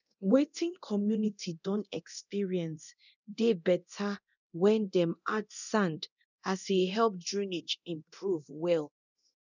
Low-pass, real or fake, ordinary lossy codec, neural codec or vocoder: 7.2 kHz; fake; none; codec, 24 kHz, 0.9 kbps, DualCodec